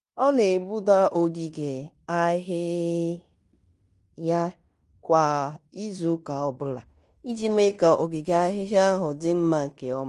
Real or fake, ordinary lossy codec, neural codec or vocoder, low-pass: fake; Opus, 32 kbps; codec, 16 kHz in and 24 kHz out, 0.9 kbps, LongCat-Audio-Codec, fine tuned four codebook decoder; 10.8 kHz